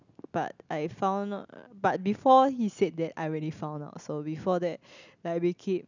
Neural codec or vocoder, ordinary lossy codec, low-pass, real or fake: none; none; 7.2 kHz; real